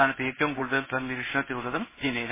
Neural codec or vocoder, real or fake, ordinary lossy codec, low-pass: codec, 16 kHz in and 24 kHz out, 1 kbps, XY-Tokenizer; fake; MP3, 16 kbps; 3.6 kHz